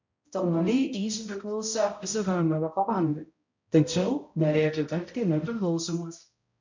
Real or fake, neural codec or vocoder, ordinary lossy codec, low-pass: fake; codec, 16 kHz, 0.5 kbps, X-Codec, HuBERT features, trained on balanced general audio; MP3, 64 kbps; 7.2 kHz